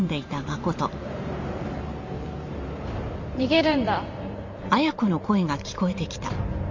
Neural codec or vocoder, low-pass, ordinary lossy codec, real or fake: vocoder, 44.1 kHz, 128 mel bands every 512 samples, BigVGAN v2; 7.2 kHz; MP3, 48 kbps; fake